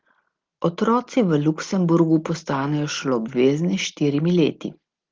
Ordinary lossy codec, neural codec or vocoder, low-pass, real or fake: Opus, 16 kbps; none; 7.2 kHz; real